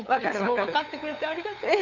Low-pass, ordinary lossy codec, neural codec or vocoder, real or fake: 7.2 kHz; none; codec, 16 kHz, 8 kbps, FunCodec, trained on LibriTTS, 25 frames a second; fake